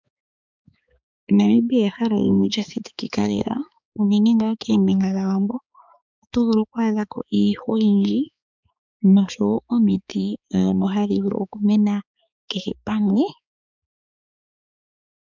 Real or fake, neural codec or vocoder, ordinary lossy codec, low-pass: fake; codec, 16 kHz, 4 kbps, X-Codec, HuBERT features, trained on balanced general audio; MP3, 64 kbps; 7.2 kHz